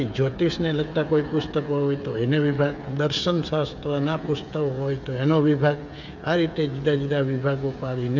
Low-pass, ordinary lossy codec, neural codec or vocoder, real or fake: 7.2 kHz; none; codec, 16 kHz, 8 kbps, FreqCodec, smaller model; fake